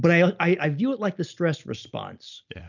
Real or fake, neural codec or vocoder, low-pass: real; none; 7.2 kHz